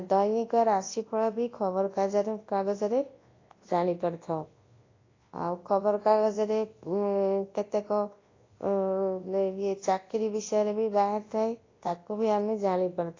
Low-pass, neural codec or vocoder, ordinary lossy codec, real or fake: 7.2 kHz; codec, 24 kHz, 0.9 kbps, WavTokenizer, large speech release; AAC, 32 kbps; fake